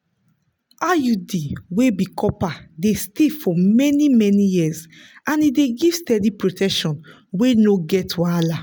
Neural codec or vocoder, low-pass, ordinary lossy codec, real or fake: none; none; none; real